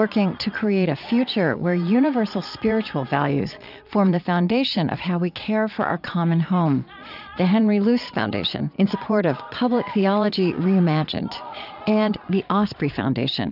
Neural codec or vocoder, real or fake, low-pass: vocoder, 22.05 kHz, 80 mel bands, Vocos; fake; 5.4 kHz